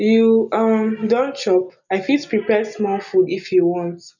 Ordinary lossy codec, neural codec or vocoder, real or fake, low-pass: none; none; real; 7.2 kHz